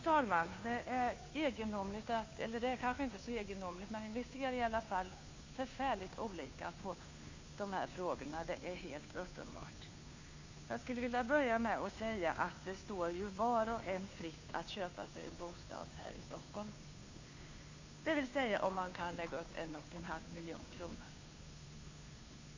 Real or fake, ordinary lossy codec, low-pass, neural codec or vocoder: fake; AAC, 48 kbps; 7.2 kHz; codec, 16 kHz, 2 kbps, FunCodec, trained on Chinese and English, 25 frames a second